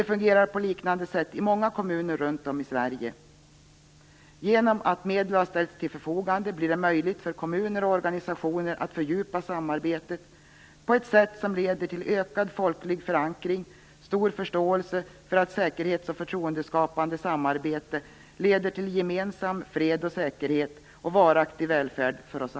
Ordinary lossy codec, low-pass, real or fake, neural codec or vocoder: none; none; real; none